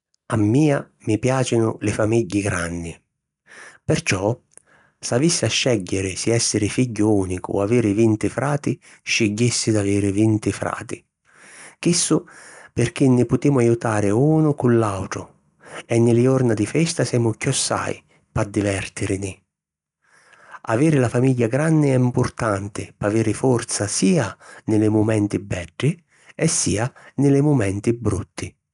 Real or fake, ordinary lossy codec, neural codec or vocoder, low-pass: real; none; none; 10.8 kHz